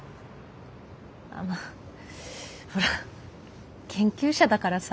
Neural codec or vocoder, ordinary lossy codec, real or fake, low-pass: none; none; real; none